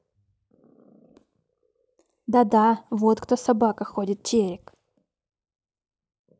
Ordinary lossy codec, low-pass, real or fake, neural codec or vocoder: none; none; real; none